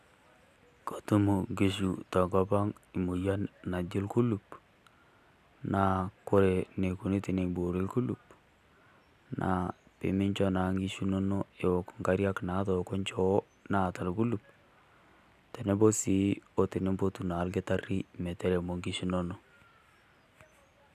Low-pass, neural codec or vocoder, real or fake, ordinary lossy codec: 14.4 kHz; none; real; none